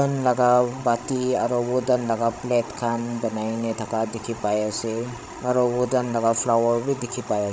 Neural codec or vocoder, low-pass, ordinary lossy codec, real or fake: codec, 16 kHz, 16 kbps, FreqCodec, larger model; none; none; fake